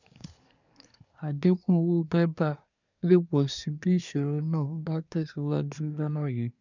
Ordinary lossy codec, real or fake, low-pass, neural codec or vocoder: none; fake; 7.2 kHz; codec, 24 kHz, 1 kbps, SNAC